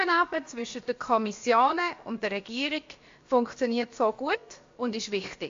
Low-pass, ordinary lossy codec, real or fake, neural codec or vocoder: 7.2 kHz; AAC, 48 kbps; fake; codec, 16 kHz, about 1 kbps, DyCAST, with the encoder's durations